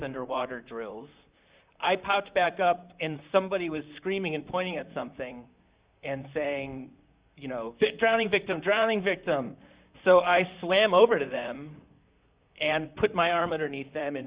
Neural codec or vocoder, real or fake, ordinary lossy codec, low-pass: vocoder, 44.1 kHz, 128 mel bands, Pupu-Vocoder; fake; Opus, 64 kbps; 3.6 kHz